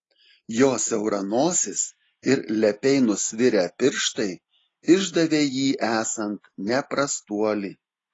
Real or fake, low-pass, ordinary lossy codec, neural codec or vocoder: real; 10.8 kHz; AAC, 32 kbps; none